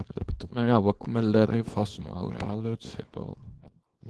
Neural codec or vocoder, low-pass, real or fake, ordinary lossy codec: codec, 24 kHz, 0.9 kbps, WavTokenizer, small release; 10.8 kHz; fake; Opus, 16 kbps